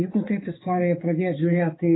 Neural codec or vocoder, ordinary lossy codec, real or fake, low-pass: codec, 16 kHz, 4 kbps, X-Codec, HuBERT features, trained on balanced general audio; AAC, 16 kbps; fake; 7.2 kHz